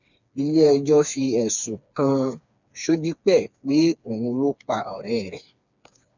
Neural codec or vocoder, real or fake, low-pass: codec, 16 kHz, 4 kbps, FreqCodec, smaller model; fake; 7.2 kHz